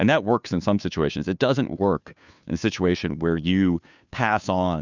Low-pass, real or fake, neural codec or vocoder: 7.2 kHz; fake; codec, 16 kHz, 2 kbps, FunCodec, trained on Chinese and English, 25 frames a second